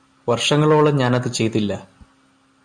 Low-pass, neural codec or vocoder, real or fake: 9.9 kHz; none; real